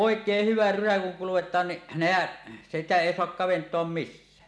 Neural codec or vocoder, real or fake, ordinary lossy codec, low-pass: none; real; none; none